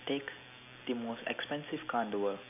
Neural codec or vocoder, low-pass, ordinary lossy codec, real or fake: none; 3.6 kHz; none; real